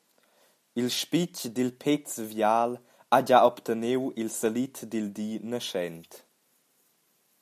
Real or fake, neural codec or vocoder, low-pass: real; none; 14.4 kHz